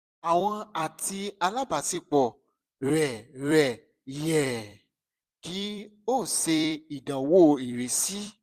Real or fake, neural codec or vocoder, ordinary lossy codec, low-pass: fake; vocoder, 44.1 kHz, 128 mel bands every 256 samples, BigVGAN v2; Opus, 64 kbps; 14.4 kHz